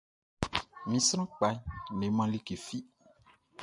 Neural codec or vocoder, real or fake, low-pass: none; real; 10.8 kHz